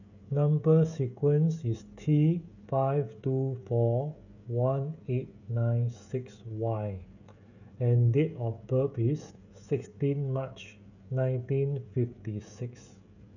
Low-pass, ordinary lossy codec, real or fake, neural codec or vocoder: 7.2 kHz; none; fake; codec, 16 kHz, 16 kbps, FreqCodec, smaller model